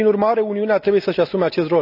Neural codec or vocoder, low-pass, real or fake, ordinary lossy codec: none; 5.4 kHz; real; none